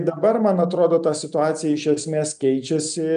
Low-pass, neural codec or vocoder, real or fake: 9.9 kHz; none; real